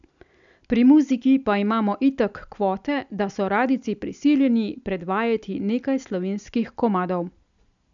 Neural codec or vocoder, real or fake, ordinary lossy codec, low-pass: none; real; none; 7.2 kHz